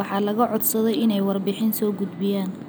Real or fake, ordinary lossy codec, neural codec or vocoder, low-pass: real; none; none; none